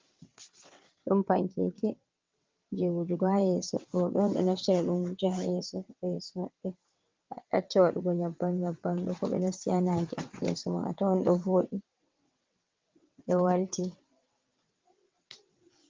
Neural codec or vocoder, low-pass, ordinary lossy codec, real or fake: vocoder, 22.05 kHz, 80 mel bands, WaveNeXt; 7.2 kHz; Opus, 32 kbps; fake